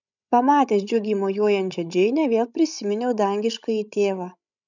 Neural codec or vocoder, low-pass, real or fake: codec, 16 kHz, 16 kbps, FreqCodec, larger model; 7.2 kHz; fake